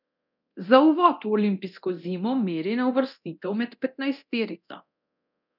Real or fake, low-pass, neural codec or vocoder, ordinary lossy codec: fake; 5.4 kHz; codec, 24 kHz, 0.9 kbps, DualCodec; none